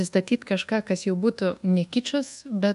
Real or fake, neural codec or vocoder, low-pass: fake; codec, 24 kHz, 1.2 kbps, DualCodec; 10.8 kHz